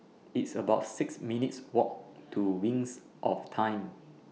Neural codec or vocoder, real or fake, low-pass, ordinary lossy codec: none; real; none; none